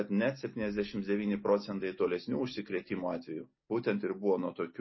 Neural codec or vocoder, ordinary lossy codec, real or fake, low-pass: none; MP3, 24 kbps; real; 7.2 kHz